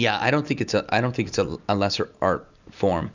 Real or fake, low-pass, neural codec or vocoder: real; 7.2 kHz; none